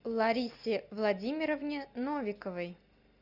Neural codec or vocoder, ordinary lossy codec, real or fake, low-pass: none; Opus, 64 kbps; real; 5.4 kHz